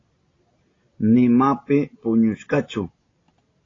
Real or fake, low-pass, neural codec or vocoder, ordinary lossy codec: real; 7.2 kHz; none; AAC, 32 kbps